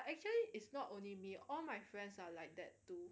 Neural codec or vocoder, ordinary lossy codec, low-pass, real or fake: none; none; none; real